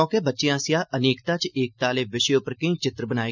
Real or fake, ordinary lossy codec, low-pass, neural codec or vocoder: real; none; 7.2 kHz; none